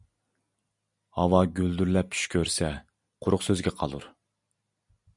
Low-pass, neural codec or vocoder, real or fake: 10.8 kHz; none; real